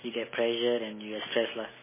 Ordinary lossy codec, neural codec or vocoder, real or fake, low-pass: MP3, 16 kbps; none; real; 3.6 kHz